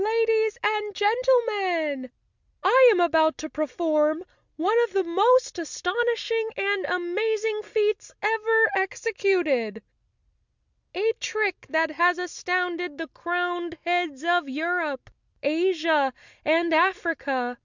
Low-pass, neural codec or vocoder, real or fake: 7.2 kHz; none; real